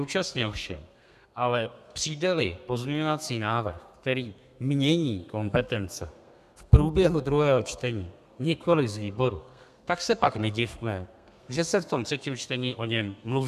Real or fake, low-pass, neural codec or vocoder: fake; 14.4 kHz; codec, 32 kHz, 1.9 kbps, SNAC